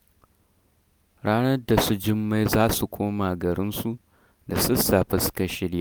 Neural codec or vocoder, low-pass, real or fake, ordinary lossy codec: none; none; real; none